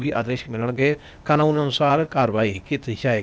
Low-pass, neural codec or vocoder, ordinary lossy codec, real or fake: none; codec, 16 kHz, 0.8 kbps, ZipCodec; none; fake